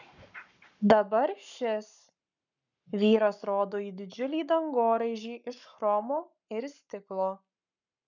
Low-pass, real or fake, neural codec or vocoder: 7.2 kHz; fake; codec, 44.1 kHz, 7.8 kbps, Pupu-Codec